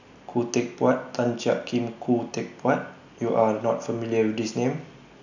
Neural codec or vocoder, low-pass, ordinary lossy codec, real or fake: none; 7.2 kHz; none; real